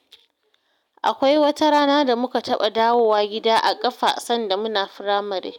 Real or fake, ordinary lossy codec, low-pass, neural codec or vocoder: real; none; 19.8 kHz; none